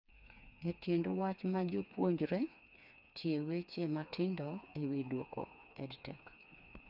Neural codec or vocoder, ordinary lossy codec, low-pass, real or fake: codec, 16 kHz, 4 kbps, FreqCodec, smaller model; none; 5.4 kHz; fake